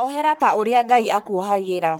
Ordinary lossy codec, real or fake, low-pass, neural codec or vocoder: none; fake; none; codec, 44.1 kHz, 1.7 kbps, Pupu-Codec